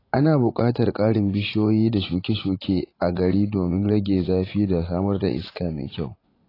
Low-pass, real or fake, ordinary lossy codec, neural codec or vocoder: 5.4 kHz; fake; AAC, 24 kbps; vocoder, 44.1 kHz, 80 mel bands, Vocos